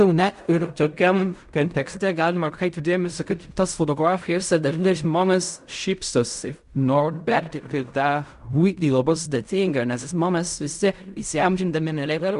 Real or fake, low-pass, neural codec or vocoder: fake; 10.8 kHz; codec, 16 kHz in and 24 kHz out, 0.4 kbps, LongCat-Audio-Codec, fine tuned four codebook decoder